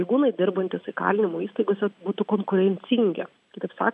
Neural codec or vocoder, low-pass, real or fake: none; 10.8 kHz; real